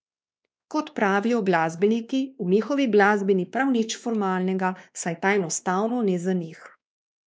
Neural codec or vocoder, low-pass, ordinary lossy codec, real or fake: codec, 16 kHz, 2 kbps, X-Codec, WavLM features, trained on Multilingual LibriSpeech; none; none; fake